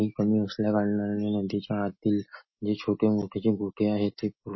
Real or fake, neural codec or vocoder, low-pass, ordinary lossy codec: real; none; 7.2 kHz; MP3, 24 kbps